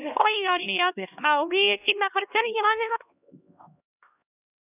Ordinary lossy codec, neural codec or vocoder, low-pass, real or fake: none; codec, 16 kHz, 1 kbps, X-Codec, HuBERT features, trained on LibriSpeech; 3.6 kHz; fake